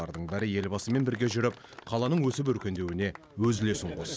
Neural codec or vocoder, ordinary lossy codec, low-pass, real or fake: none; none; none; real